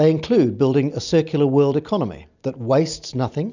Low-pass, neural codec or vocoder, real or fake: 7.2 kHz; none; real